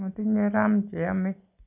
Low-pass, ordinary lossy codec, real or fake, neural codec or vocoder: 3.6 kHz; none; real; none